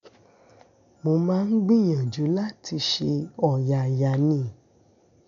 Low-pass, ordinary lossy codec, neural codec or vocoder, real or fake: 7.2 kHz; none; none; real